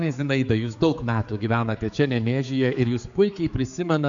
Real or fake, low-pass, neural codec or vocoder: fake; 7.2 kHz; codec, 16 kHz, 4 kbps, X-Codec, HuBERT features, trained on general audio